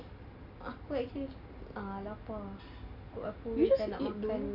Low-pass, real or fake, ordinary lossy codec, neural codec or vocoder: 5.4 kHz; real; AAC, 48 kbps; none